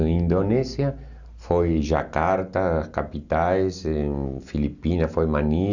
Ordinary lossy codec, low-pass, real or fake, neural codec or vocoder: none; 7.2 kHz; real; none